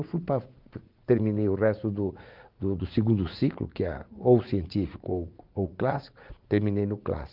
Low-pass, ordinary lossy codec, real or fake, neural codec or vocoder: 5.4 kHz; Opus, 32 kbps; fake; vocoder, 44.1 kHz, 80 mel bands, Vocos